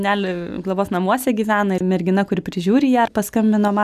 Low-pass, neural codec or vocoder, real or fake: 14.4 kHz; none; real